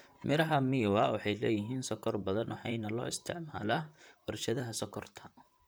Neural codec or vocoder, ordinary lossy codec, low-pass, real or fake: vocoder, 44.1 kHz, 128 mel bands, Pupu-Vocoder; none; none; fake